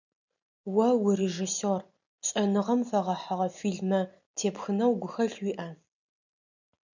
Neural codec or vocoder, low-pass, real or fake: none; 7.2 kHz; real